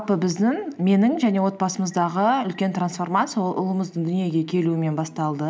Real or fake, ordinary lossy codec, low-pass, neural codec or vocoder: real; none; none; none